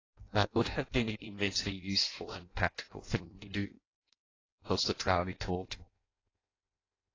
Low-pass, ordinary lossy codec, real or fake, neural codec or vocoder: 7.2 kHz; AAC, 32 kbps; fake; codec, 16 kHz in and 24 kHz out, 0.6 kbps, FireRedTTS-2 codec